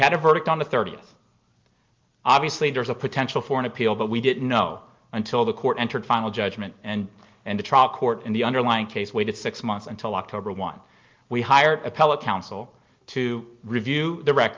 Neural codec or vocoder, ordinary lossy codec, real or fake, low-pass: none; Opus, 32 kbps; real; 7.2 kHz